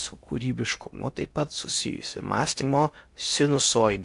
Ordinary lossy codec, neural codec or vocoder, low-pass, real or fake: AAC, 64 kbps; codec, 16 kHz in and 24 kHz out, 0.8 kbps, FocalCodec, streaming, 65536 codes; 10.8 kHz; fake